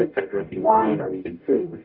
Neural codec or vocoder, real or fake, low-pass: codec, 44.1 kHz, 0.9 kbps, DAC; fake; 5.4 kHz